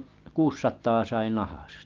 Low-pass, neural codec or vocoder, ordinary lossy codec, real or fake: 7.2 kHz; none; Opus, 32 kbps; real